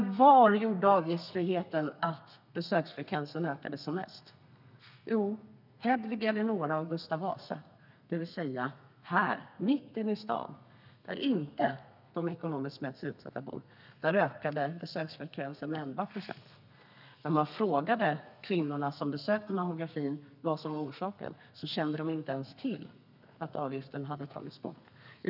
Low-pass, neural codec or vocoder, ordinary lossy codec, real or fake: 5.4 kHz; codec, 32 kHz, 1.9 kbps, SNAC; none; fake